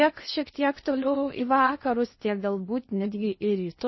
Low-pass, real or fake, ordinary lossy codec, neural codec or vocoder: 7.2 kHz; fake; MP3, 24 kbps; codec, 16 kHz in and 24 kHz out, 0.8 kbps, FocalCodec, streaming, 65536 codes